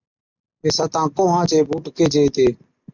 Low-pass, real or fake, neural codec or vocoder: 7.2 kHz; real; none